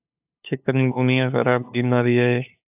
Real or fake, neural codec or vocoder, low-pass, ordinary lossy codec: fake; codec, 16 kHz, 2 kbps, FunCodec, trained on LibriTTS, 25 frames a second; 3.6 kHz; AAC, 32 kbps